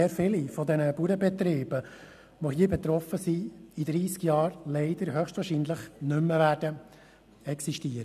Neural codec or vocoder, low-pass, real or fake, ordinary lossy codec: none; 14.4 kHz; real; none